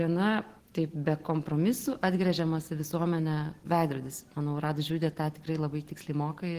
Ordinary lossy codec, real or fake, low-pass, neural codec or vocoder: Opus, 16 kbps; real; 14.4 kHz; none